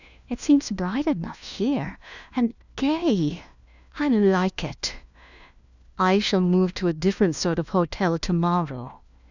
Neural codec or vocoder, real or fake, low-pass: codec, 16 kHz, 1 kbps, FunCodec, trained on LibriTTS, 50 frames a second; fake; 7.2 kHz